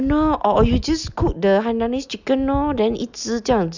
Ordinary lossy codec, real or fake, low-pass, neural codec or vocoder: none; real; 7.2 kHz; none